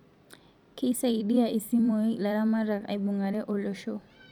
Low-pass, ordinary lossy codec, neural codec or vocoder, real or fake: 19.8 kHz; none; vocoder, 44.1 kHz, 128 mel bands every 256 samples, BigVGAN v2; fake